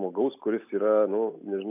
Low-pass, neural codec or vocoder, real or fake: 3.6 kHz; none; real